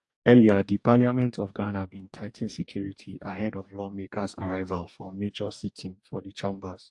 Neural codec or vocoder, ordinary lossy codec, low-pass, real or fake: codec, 44.1 kHz, 2.6 kbps, DAC; AAC, 64 kbps; 10.8 kHz; fake